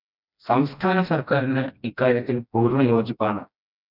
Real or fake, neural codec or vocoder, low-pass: fake; codec, 16 kHz, 1 kbps, FreqCodec, smaller model; 5.4 kHz